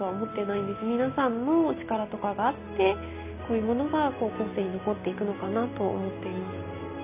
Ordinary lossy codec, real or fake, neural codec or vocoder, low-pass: none; real; none; 3.6 kHz